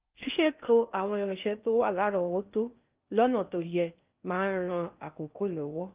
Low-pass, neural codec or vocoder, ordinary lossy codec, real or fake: 3.6 kHz; codec, 16 kHz in and 24 kHz out, 0.6 kbps, FocalCodec, streaming, 2048 codes; Opus, 24 kbps; fake